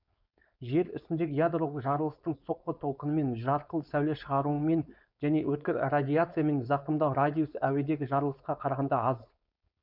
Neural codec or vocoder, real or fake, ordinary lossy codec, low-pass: codec, 16 kHz, 4.8 kbps, FACodec; fake; none; 5.4 kHz